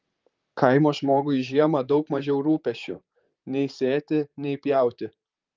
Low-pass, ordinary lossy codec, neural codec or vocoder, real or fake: 7.2 kHz; Opus, 24 kbps; vocoder, 44.1 kHz, 128 mel bands, Pupu-Vocoder; fake